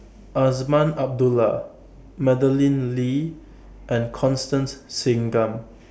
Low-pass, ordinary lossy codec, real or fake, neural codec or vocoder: none; none; real; none